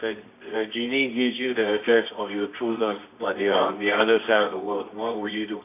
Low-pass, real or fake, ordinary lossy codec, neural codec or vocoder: 3.6 kHz; fake; AAC, 24 kbps; codec, 24 kHz, 0.9 kbps, WavTokenizer, medium music audio release